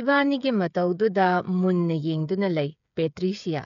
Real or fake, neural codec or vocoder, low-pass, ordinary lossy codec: fake; codec, 16 kHz, 8 kbps, FreqCodec, smaller model; 7.2 kHz; none